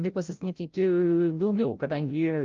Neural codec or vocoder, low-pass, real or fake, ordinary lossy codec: codec, 16 kHz, 0.5 kbps, FreqCodec, larger model; 7.2 kHz; fake; Opus, 32 kbps